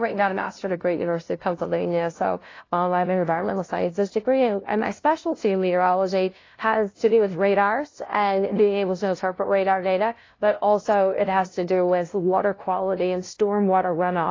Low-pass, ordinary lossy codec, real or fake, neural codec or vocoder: 7.2 kHz; AAC, 32 kbps; fake; codec, 16 kHz, 0.5 kbps, FunCodec, trained on LibriTTS, 25 frames a second